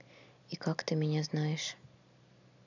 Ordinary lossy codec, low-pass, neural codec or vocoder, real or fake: none; 7.2 kHz; none; real